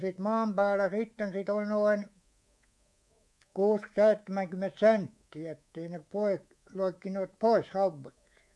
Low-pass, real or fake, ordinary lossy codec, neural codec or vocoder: none; fake; none; codec, 24 kHz, 3.1 kbps, DualCodec